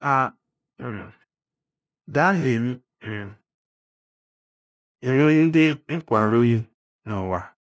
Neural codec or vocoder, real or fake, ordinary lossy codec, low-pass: codec, 16 kHz, 0.5 kbps, FunCodec, trained on LibriTTS, 25 frames a second; fake; none; none